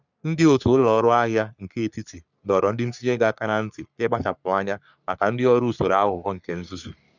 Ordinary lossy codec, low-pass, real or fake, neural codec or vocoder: none; 7.2 kHz; fake; codec, 44.1 kHz, 3.4 kbps, Pupu-Codec